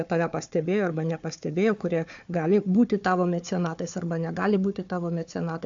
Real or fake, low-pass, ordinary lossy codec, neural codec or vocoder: fake; 7.2 kHz; AAC, 64 kbps; codec, 16 kHz, 4 kbps, FunCodec, trained on Chinese and English, 50 frames a second